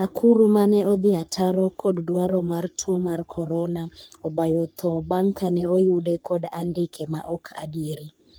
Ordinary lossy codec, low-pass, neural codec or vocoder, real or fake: none; none; codec, 44.1 kHz, 3.4 kbps, Pupu-Codec; fake